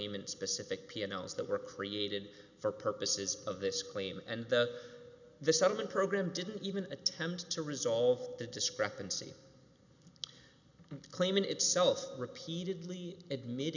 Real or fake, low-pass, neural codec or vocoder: real; 7.2 kHz; none